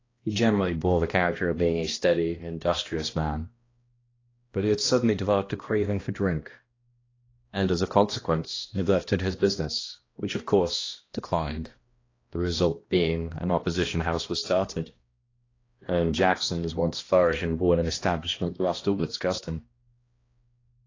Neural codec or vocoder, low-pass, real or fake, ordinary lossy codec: codec, 16 kHz, 1 kbps, X-Codec, HuBERT features, trained on balanced general audio; 7.2 kHz; fake; AAC, 32 kbps